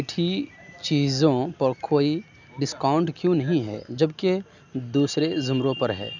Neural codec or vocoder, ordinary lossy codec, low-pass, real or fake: none; none; 7.2 kHz; real